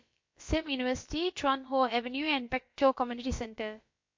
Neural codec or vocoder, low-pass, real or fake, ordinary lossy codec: codec, 16 kHz, about 1 kbps, DyCAST, with the encoder's durations; 7.2 kHz; fake; MP3, 48 kbps